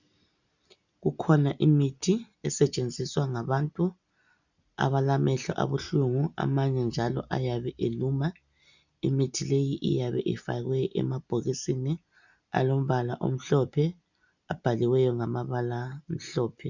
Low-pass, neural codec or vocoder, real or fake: 7.2 kHz; none; real